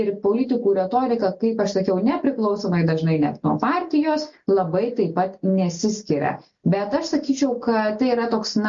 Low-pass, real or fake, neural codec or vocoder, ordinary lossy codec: 7.2 kHz; real; none; MP3, 48 kbps